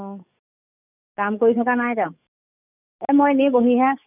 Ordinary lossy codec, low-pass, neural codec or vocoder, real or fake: none; 3.6 kHz; codec, 44.1 kHz, 7.8 kbps, DAC; fake